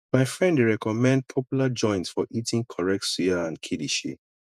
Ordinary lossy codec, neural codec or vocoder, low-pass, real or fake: none; vocoder, 48 kHz, 128 mel bands, Vocos; 14.4 kHz; fake